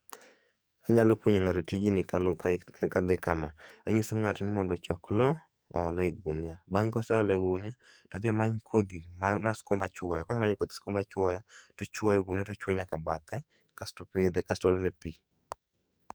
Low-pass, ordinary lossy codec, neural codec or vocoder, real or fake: none; none; codec, 44.1 kHz, 2.6 kbps, SNAC; fake